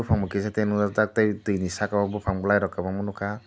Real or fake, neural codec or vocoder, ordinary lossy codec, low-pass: real; none; none; none